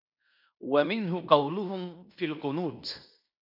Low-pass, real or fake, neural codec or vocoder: 5.4 kHz; fake; codec, 16 kHz in and 24 kHz out, 0.9 kbps, LongCat-Audio-Codec, fine tuned four codebook decoder